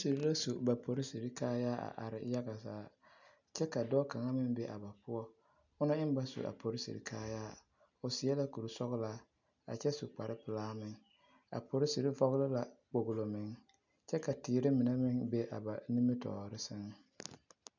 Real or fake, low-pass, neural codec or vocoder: real; 7.2 kHz; none